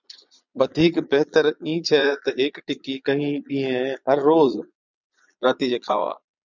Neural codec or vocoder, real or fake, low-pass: vocoder, 22.05 kHz, 80 mel bands, Vocos; fake; 7.2 kHz